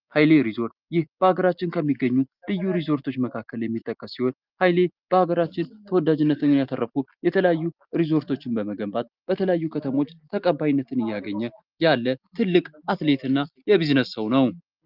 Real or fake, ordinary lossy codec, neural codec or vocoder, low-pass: real; Opus, 24 kbps; none; 5.4 kHz